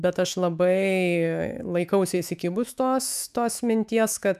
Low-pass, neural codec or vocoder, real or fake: 14.4 kHz; autoencoder, 48 kHz, 128 numbers a frame, DAC-VAE, trained on Japanese speech; fake